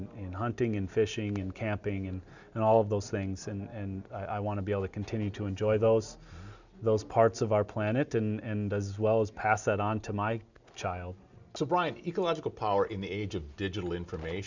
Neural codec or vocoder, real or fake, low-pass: none; real; 7.2 kHz